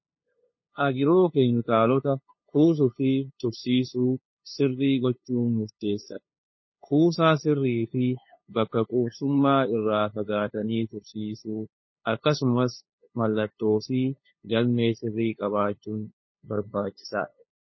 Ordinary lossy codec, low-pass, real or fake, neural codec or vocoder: MP3, 24 kbps; 7.2 kHz; fake; codec, 16 kHz, 2 kbps, FunCodec, trained on LibriTTS, 25 frames a second